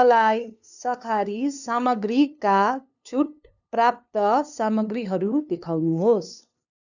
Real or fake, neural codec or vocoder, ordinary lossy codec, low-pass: fake; codec, 16 kHz, 2 kbps, FunCodec, trained on LibriTTS, 25 frames a second; none; 7.2 kHz